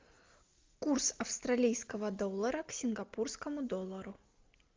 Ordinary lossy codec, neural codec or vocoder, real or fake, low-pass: Opus, 32 kbps; none; real; 7.2 kHz